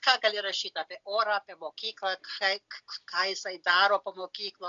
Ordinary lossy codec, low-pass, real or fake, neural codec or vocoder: AAC, 64 kbps; 7.2 kHz; real; none